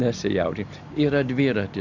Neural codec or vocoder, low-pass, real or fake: none; 7.2 kHz; real